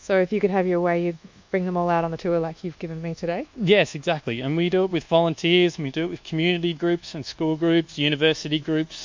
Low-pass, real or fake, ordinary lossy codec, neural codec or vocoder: 7.2 kHz; fake; MP3, 64 kbps; codec, 24 kHz, 1.2 kbps, DualCodec